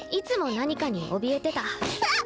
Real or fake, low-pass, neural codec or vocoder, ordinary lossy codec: real; none; none; none